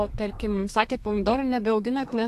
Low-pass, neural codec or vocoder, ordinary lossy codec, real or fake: 14.4 kHz; codec, 32 kHz, 1.9 kbps, SNAC; AAC, 64 kbps; fake